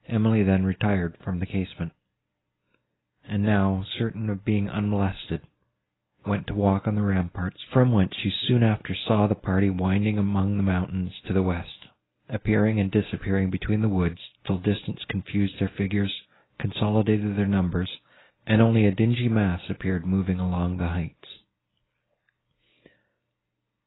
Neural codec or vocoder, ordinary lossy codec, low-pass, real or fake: none; AAC, 16 kbps; 7.2 kHz; real